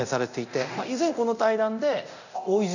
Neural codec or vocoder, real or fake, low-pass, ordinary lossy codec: codec, 24 kHz, 0.9 kbps, DualCodec; fake; 7.2 kHz; none